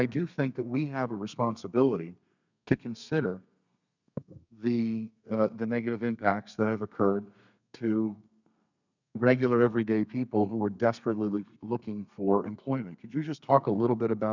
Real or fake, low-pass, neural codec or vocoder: fake; 7.2 kHz; codec, 32 kHz, 1.9 kbps, SNAC